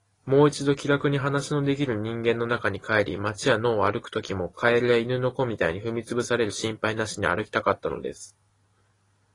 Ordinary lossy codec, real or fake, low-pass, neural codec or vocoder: AAC, 32 kbps; real; 10.8 kHz; none